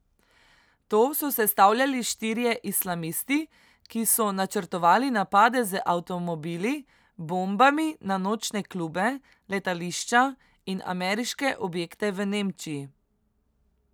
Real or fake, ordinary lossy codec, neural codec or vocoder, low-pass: real; none; none; none